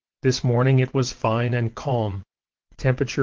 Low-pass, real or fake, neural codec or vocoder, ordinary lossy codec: 7.2 kHz; fake; vocoder, 22.05 kHz, 80 mel bands, WaveNeXt; Opus, 16 kbps